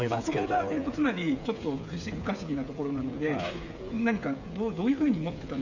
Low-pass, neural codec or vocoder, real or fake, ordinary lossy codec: 7.2 kHz; codec, 16 kHz, 8 kbps, FreqCodec, smaller model; fake; none